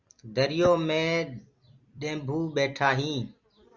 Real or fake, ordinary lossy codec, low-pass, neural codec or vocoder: fake; Opus, 64 kbps; 7.2 kHz; vocoder, 44.1 kHz, 128 mel bands every 256 samples, BigVGAN v2